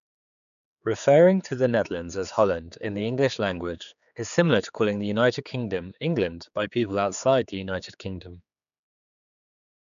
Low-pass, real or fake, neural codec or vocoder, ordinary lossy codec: 7.2 kHz; fake; codec, 16 kHz, 4 kbps, X-Codec, HuBERT features, trained on general audio; none